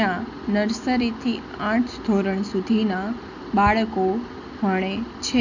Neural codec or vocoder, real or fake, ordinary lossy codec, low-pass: none; real; none; 7.2 kHz